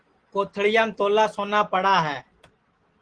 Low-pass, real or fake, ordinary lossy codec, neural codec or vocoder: 9.9 kHz; real; Opus, 16 kbps; none